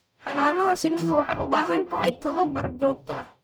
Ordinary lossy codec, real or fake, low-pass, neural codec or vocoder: none; fake; none; codec, 44.1 kHz, 0.9 kbps, DAC